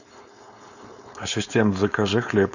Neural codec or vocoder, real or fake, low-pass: codec, 16 kHz, 4.8 kbps, FACodec; fake; 7.2 kHz